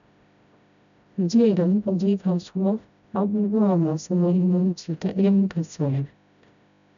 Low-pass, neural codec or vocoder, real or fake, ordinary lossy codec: 7.2 kHz; codec, 16 kHz, 0.5 kbps, FreqCodec, smaller model; fake; none